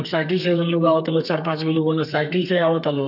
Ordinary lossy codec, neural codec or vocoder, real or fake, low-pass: none; codec, 32 kHz, 1.9 kbps, SNAC; fake; 5.4 kHz